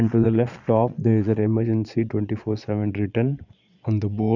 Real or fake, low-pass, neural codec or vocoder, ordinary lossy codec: fake; 7.2 kHz; vocoder, 22.05 kHz, 80 mel bands, Vocos; none